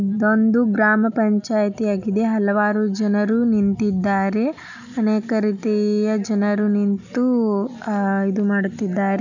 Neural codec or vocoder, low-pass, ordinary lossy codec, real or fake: none; 7.2 kHz; none; real